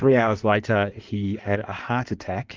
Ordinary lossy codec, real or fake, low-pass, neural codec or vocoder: Opus, 24 kbps; fake; 7.2 kHz; codec, 16 kHz in and 24 kHz out, 1.1 kbps, FireRedTTS-2 codec